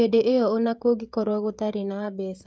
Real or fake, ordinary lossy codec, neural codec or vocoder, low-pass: fake; none; codec, 16 kHz, 16 kbps, FreqCodec, smaller model; none